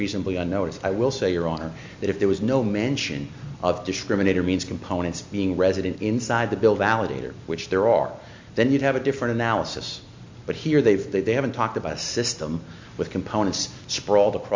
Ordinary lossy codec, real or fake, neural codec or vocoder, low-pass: MP3, 64 kbps; real; none; 7.2 kHz